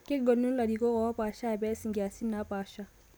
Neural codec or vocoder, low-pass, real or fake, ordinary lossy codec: none; none; real; none